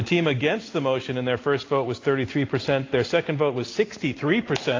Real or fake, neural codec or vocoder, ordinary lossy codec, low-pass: real; none; AAC, 32 kbps; 7.2 kHz